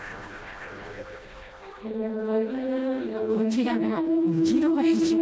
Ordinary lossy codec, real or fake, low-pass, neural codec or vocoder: none; fake; none; codec, 16 kHz, 1 kbps, FreqCodec, smaller model